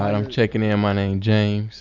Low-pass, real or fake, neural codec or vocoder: 7.2 kHz; real; none